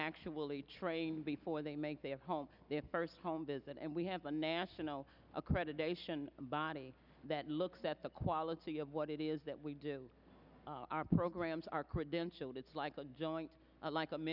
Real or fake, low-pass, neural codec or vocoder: real; 5.4 kHz; none